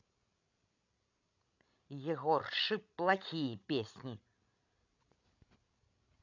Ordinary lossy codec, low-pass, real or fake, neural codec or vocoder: none; 7.2 kHz; fake; codec, 16 kHz, 16 kbps, FreqCodec, larger model